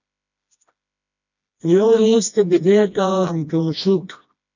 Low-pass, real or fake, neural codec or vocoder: 7.2 kHz; fake; codec, 16 kHz, 1 kbps, FreqCodec, smaller model